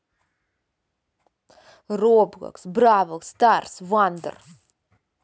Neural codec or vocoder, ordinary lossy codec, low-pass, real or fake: none; none; none; real